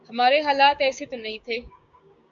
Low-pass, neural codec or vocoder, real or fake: 7.2 kHz; codec, 16 kHz, 6 kbps, DAC; fake